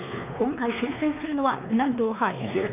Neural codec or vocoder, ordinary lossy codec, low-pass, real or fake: codec, 16 kHz, 2 kbps, X-Codec, WavLM features, trained on Multilingual LibriSpeech; none; 3.6 kHz; fake